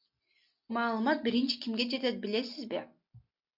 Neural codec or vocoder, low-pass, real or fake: none; 5.4 kHz; real